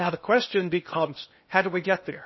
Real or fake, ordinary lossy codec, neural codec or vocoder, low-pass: fake; MP3, 24 kbps; codec, 16 kHz in and 24 kHz out, 0.6 kbps, FocalCodec, streaming, 2048 codes; 7.2 kHz